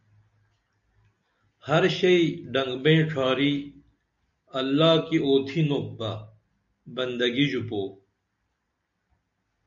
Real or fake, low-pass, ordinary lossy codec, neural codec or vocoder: real; 7.2 kHz; MP3, 64 kbps; none